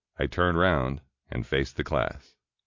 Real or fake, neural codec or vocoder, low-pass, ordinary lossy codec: real; none; 7.2 kHz; MP3, 48 kbps